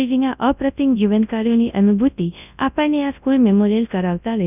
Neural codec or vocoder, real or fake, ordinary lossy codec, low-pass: codec, 24 kHz, 0.9 kbps, WavTokenizer, large speech release; fake; none; 3.6 kHz